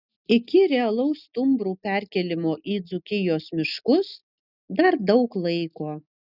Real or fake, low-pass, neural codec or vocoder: real; 5.4 kHz; none